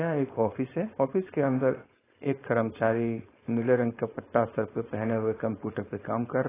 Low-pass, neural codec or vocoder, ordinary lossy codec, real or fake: 3.6 kHz; codec, 16 kHz, 4.8 kbps, FACodec; AAC, 16 kbps; fake